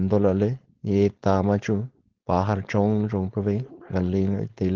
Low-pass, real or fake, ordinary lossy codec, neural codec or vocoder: 7.2 kHz; fake; Opus, 16 kbps; codec, 16 kHz, 4.8 kbps, FACodec